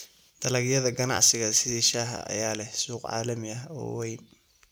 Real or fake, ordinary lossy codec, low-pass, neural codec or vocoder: real; none; none; none